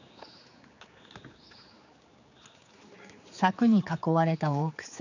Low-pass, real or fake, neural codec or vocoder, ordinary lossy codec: 7.2 kHz; fake; codec, 16 kHz, 4 kbps, X-Codec, HuBERT features, trained on general audio; none